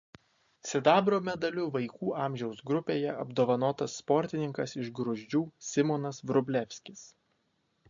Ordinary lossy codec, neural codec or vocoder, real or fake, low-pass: MP3, 48 kbps; none; real; 7.2 kHz